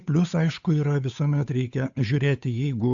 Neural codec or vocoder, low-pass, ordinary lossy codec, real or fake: codec, 16 kHz, 8 kbps, FunCodec, trained on Chinese and English, 25 frames a second; 7.2 kHz; AAC, 64 kbps; fake